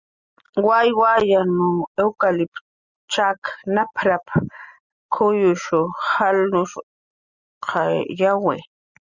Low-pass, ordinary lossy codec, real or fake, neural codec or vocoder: 7.2 kHz; Opus, 64 kbps; real; none